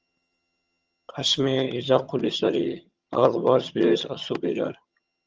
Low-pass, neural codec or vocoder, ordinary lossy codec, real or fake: 7.2 kHz; vocoder, 22.05 kHz, 80 mel bands, HiFi-GAN; Opus, 24 kbps; fake